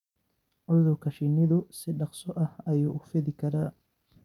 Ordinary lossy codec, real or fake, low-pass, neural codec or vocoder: none; real; 19.8 kHz; none